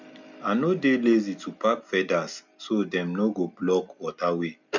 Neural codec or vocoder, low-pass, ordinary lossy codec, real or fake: none; 7.2 kHz; none; real